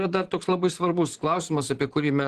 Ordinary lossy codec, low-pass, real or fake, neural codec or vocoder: Opus, 16 kbps; 14.4 kHz; fake; vocoder, 44.1 kHz, 128 mel bands every 512 samples, BigVGAN v2